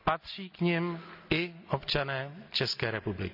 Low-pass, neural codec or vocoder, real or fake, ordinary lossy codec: 5.4 kHz; none; real; none